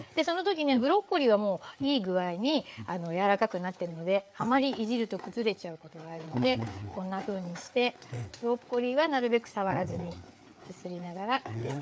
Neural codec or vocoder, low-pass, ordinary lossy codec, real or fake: codec, 16 kHz, 4 kbps, FreqCodec, larger model; none; none; fake